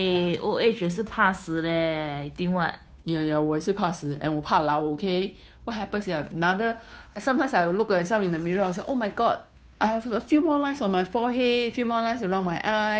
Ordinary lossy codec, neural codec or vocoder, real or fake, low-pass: none; codec, 16 kHz, 2 kbps, FunCodec, trained on Chinese and English, 25 frames a second; fake; none